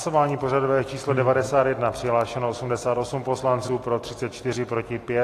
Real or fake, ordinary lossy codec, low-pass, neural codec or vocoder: real; AAC, 48 kbps; 14.4 kHz; none